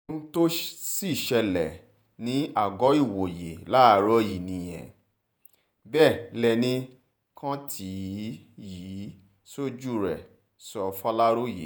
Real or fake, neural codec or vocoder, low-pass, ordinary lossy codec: fake; vocoder, 48 kHz, 128 mel bands, Vocos; none; none